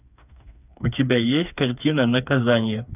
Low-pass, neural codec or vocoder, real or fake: 3.6 kHz; codec, 16 kHz, 4 kbps, FreqCodec, smaller model; fake